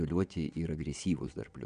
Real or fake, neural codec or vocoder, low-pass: fake; vocoder, 22.05 kHz, 80 mel bands, WaveNeXt; 9.9 kHz